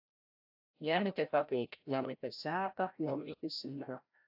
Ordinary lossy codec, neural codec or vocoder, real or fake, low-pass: AAC, 48 kbps; codec, 16 kHz, 0.5 kbps, FreqCodec, larger model; fake; 5.4 kHz